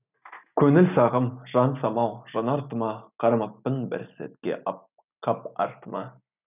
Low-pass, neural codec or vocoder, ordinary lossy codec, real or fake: 3.6 kHz; none; none; real